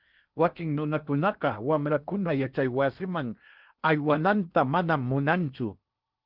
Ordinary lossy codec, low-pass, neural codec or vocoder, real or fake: Opus, 24 kbps; 5.4 kHz; codec, 16 kHz in and 24 kHz out, 0.8 kbps, FocalCodec, streaming, 65536 codes; fake